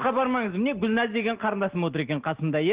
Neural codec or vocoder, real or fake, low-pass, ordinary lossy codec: none; real; 3.6 kHz; Opus, 16 kbps